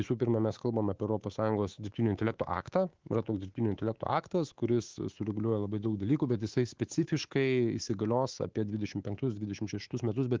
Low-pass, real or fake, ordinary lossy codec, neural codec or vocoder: 7.2 kHz; fake; Opus, 16 kbps; codec, 24 kHz, 3.1 kbps, DualCodec